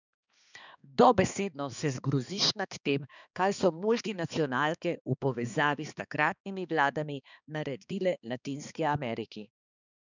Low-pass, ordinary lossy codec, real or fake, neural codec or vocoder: 7.2 kHz; none; fake; codec, 16 kHz, 2 kbps, X-Codec, HuBERT features, trained on balanced general audio